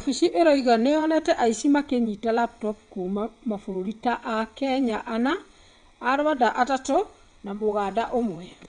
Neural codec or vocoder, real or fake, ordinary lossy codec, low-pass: vocoder, 22.05 kHz, 80 mel bands, WaveNeXt; fake; none; 9.9 kHz